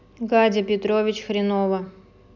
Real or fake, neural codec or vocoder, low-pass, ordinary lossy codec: real; none; 7.2 kHz; none